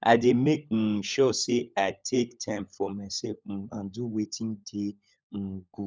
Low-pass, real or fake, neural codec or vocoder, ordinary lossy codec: none; fake; codec, 16 kHz, 16 kbps, FunCodec, trained on LibriTTS, 50 frames a second; none